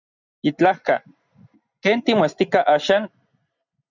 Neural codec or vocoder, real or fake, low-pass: none; real; 7.2 kHz